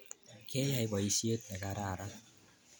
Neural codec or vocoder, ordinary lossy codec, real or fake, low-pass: none; none; real; none